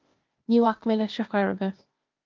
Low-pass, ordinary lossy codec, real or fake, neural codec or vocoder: 7.2 kHz; Opus, 32 kbps; fake; codec, 16 kHz, 0.8 kbps, ZipCodec